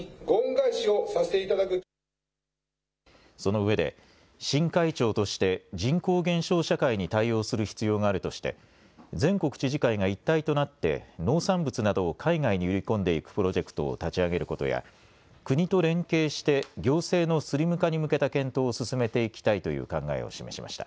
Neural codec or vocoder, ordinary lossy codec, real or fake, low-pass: none; none; real; none